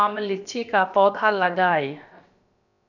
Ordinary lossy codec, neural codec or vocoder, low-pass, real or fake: none; codec, 16 kHz, about 1 kbps, DyCAST, with the encoder's durations; 7.2 kHz; fake